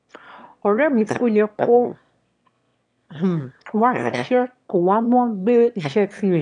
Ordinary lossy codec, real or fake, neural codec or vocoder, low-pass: none; fake; autoencoder, 22.05 kHz, a latent of 192 numbers a frame, VITS, trained on one speaker; 9.9 kHz